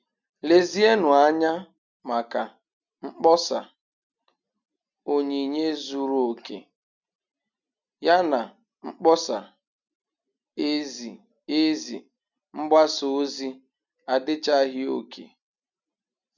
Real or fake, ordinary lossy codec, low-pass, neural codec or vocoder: real; none; 7.2 kHz; none